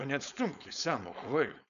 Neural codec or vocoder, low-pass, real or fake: codec, 16 kHz, 4.8 kbps, FACodec; 7.2 kHz; fake